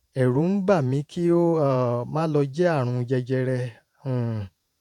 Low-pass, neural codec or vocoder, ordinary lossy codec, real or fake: 19.8 kHz; vocoder, 48 kHz, 128 mel bands, Vocos; none; fake